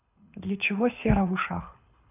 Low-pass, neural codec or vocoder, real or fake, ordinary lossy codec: 3.6 kHz; codec, 24 kHz, 6 kbps, HILCodec; fake; none